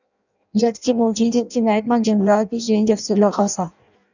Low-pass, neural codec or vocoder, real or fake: 7.2 kHz; codec, 16 kHz in and 24 kHz out, 0.6 kbps, FireRedTTS-2 codec; fake